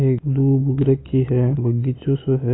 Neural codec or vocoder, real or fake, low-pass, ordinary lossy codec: none; real; 7.2 kHz; AAC, 16 kbps